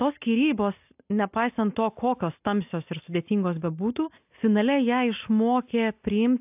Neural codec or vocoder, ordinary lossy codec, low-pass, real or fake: none; AAC, 32 kbps; 3.6 kHz; real